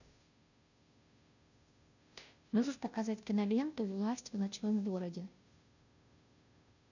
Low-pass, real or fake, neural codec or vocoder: 7.2 kHz; fake; codec, 16 kHz, 0.5 kbps, FunCodec, trained on Chinese and English, 25 frames a second